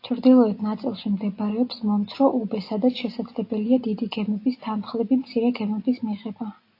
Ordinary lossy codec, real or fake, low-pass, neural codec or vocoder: AAC, 32 kbps; real; 5.4 kHz; none